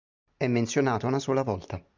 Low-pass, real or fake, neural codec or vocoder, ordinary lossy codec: 7.2 kHz; real; none; MP3, 64 kbps